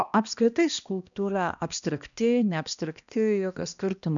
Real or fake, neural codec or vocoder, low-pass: fake; codec, 16 kHz, 1 kbps, X-Codec, HuBERT features, trained on balanced general audio; 7.2 kHz